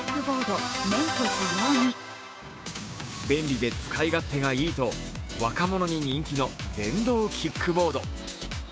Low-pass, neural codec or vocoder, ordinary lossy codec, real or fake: none; codec, 16 kHz, 6 kbps, DAC; none; fake